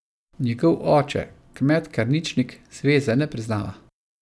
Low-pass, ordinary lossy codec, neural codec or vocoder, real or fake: none; none; none; real